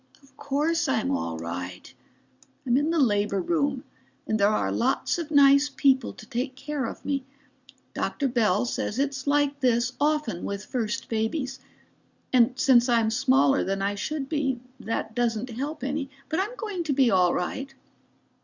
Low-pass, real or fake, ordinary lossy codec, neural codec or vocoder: 7.2 kHz; real; Opus, 64 kbps; none